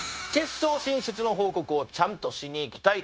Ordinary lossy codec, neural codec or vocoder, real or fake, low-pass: none; codec, 16 kHz, 0.9 kbps, LongCat-Audio-Codec; fake; none